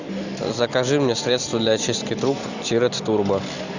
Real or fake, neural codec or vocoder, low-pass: real; none; 7.2 kHz